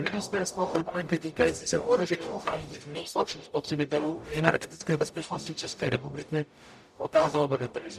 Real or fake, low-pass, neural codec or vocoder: fake; 14.4 kHz; codec, 44.1 kHz, 0.9 kbps, DAC